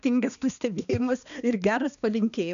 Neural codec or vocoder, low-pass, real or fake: codec, 16 kHz, 2 kbps, X-Codec, HuBERT features, trained on balanced general audio; 7.2 kHz; fake